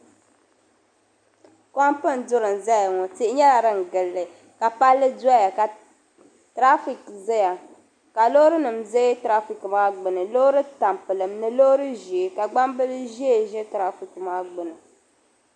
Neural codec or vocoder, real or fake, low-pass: none; real; 9.9 kHz